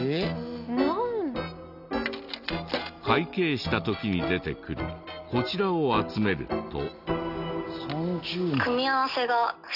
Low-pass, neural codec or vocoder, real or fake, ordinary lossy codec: 5.4 kHz; none; real; none